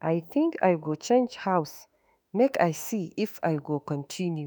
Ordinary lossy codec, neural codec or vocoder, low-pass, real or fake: none; autoencoder, 48 kHz, 32 numbers a frame, DAC-VAE, trained on Japanese speech; none; fake